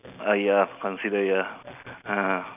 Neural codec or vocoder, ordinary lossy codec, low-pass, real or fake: none; none; 3.6 kHz; real